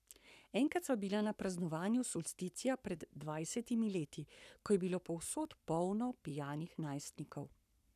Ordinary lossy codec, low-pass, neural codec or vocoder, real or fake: none; 14.4 kHz; codec, 44.1 kHz, 7.8 kbps, Pupu-Codec; fake